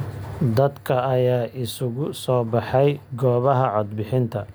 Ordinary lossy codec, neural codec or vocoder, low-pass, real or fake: none; none; none; real